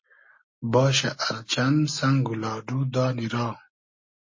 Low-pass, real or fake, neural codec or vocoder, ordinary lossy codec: 7.2 kHz; real; none; MP3, 32 kbps